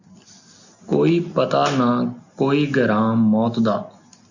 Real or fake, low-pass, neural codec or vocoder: real; 7.2 kHz; none